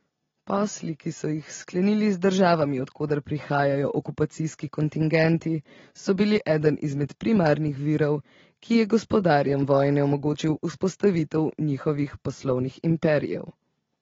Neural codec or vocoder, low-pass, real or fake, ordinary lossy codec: none; 7.2 kHz; real; AAC, 24 kbps